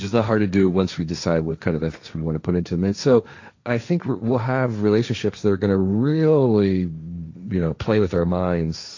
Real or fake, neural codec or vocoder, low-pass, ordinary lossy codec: fake; codec, 16 kHz, 1.1 kbps, Voila-Tokenizer; 7.2 kHz; AAC, 48 kbps